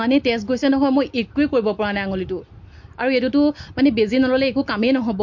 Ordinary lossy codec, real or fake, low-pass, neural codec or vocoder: MP3, 48 kbps; real; 7.2 kHz; none